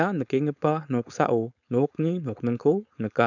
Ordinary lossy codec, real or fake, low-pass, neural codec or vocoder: none; fake; 7.2 kHz; codec, 16 kHz, 4.8 kbps, FACodec